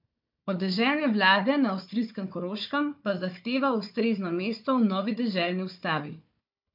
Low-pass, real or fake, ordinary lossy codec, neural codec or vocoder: 5.4 kHz; fake; MP3, 48 kbps; codec, 16 kHz, 4 kbps, FunCodec, trained on Chinese and English, 50 frames a second